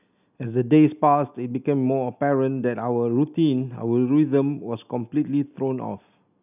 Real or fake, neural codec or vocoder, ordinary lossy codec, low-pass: real; none; none; 3.6 kHz